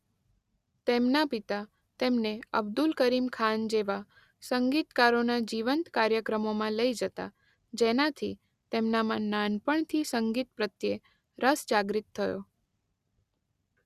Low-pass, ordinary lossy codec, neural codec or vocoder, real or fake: 14.4 kHz; Opus, 64 kbps; none; real